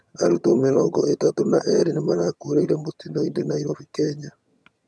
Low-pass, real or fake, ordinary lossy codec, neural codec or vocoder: none; fake; none; vocoder, 22.05 kHz, 80 mel bands, HiFi-GAN